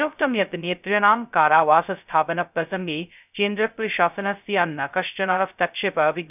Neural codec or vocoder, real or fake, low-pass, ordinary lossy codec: codec, 16 kHz, 0.2 kbps, FocalCodec; fake; 3.6 kHz; none